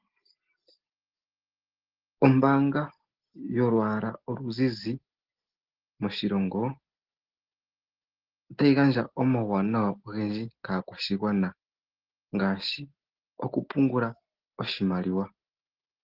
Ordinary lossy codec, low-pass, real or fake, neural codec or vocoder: Opus, 16 kbps; 5.4 kHz; real; none